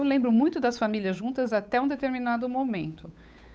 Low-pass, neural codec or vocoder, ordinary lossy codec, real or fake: none; codec, 16 kHz, 8 kbps, FunCodec, trained on Chinese and English, 25 frames a second; none; fake